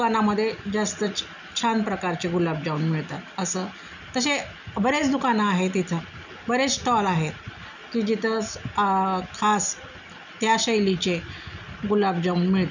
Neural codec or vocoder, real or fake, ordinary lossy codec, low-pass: none; real; none; 7.2 kHz